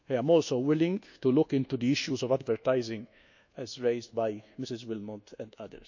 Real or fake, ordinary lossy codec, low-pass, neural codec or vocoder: fake; none; 7.2 kHz; codec, 24 kHz, 1.2 kbps, DualCodec